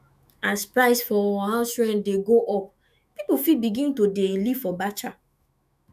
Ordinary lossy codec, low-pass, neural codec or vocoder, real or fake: none; 14.4 kHz; autoencoder, 48 kHz, 128 numbers a frame, DAC-VAE, trained on Japanese speech; fake